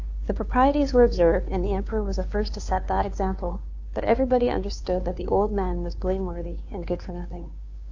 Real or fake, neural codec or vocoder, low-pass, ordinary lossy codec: fake; codec, 16 kHz, 2 kbps, FunCodec, trained on Chinese and English, 25 frames a second; 7.2 kHz; AAC, 48 kbps